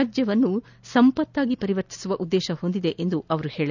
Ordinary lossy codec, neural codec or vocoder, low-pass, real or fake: none; none; 7.2 kHz; real